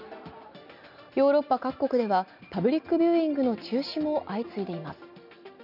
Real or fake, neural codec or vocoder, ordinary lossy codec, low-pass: real; none; none; 5.4 kHz